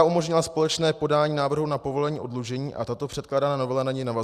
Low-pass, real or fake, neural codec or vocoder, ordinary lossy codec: 14.4 kHz; real; none; Opus, 64 kbps